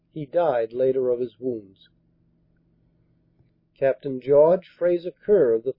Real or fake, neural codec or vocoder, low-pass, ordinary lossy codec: real; none; 5.4 kHz; MP3, 24 kbps